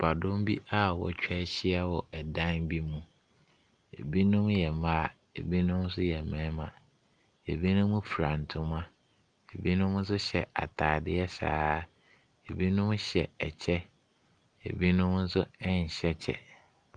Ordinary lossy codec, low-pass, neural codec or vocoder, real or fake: Opus, 32 kbps; 9.9 kHz; none; real